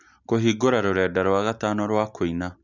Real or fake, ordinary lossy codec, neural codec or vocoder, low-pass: real; none; none; 7.2 kHz